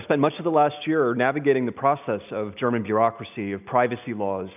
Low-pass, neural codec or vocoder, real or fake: 3.6 kHz; none; real